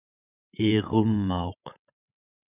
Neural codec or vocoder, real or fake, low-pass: codec, 16 kHz, 16 kbps, FreqCodec, larger model; fake; 3.6 kHz